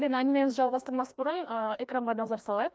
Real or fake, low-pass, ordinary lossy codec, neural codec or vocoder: fake; none; none; codec, 16 kHz, 1 kbps, FreqCodec, larger model